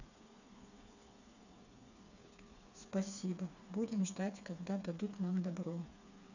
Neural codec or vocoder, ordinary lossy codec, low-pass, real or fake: codec, 16 kHz, 4 kbps, FreqCodec, smaller model; none; 7.2 kHz; fake